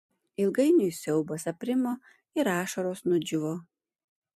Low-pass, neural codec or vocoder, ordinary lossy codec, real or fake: 14.4 kHz; vocoder, 44.1 kHz, 128 mel bands every 256 samples, BigVGAN v2; MP3, 64 kbps; fake